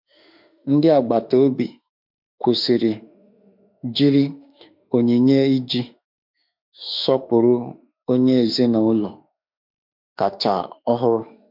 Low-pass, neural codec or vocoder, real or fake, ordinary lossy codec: 5.4 kHz; autoencoder, 48 kHz, 32 numbers a frame, DAC-VAE, trained on Japanese speech; fake; MP3, 48 kbps